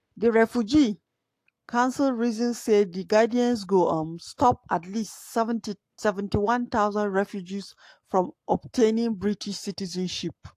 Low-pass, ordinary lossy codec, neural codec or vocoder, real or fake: 14.4 kHz; AAC, 64 kbps; codec, 44.1 kHz, 7.8 kbps, Pupu-Codec; fake